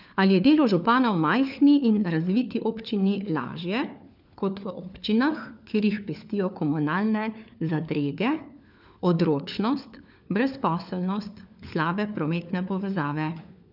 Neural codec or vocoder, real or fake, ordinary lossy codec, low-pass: codec, 16 kHz, 4 kbps, FunCodec, trained on LibriTTS, 50 frames a second; fake; none; 5.4 kHz